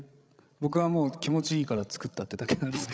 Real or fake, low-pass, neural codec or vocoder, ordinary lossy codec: fake; none; codec, 16 kHz, 16 kbps, FreqCodec, larger model; none